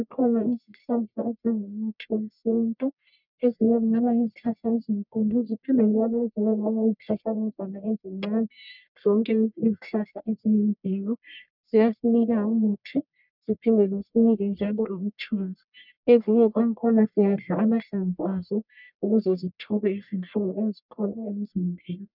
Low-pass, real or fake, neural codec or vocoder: 5.4 kHz; fake; codec, 44.1 kHz, 1.7 kbps, Pupu-Codec